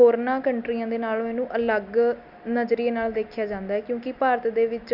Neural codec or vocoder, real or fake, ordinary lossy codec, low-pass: none; real; none; 5.4 kHz